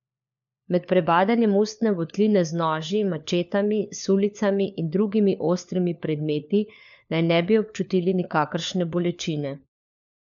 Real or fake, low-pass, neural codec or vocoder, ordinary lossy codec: fake; 7.2 kHz; codec, 16 kHz, 4 kbps, FunCodec, trained on LibriTTS, 50 frames a second; none